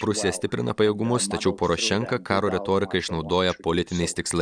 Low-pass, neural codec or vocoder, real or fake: 9.9 kHz; none; real